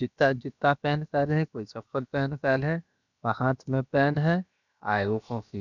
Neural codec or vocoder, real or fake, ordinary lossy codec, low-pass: codec, 16 kHz, about 1 kbps, DyCAST, with the encoder's durations; fake; none; 7.2 kHz